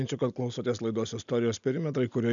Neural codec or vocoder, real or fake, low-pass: none; real; 7.2 kHz